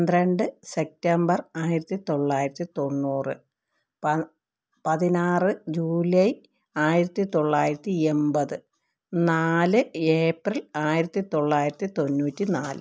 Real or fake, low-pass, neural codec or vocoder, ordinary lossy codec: real; none; none; none